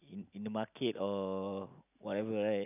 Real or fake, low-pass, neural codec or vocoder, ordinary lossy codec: real; 3.6 kHz; none; none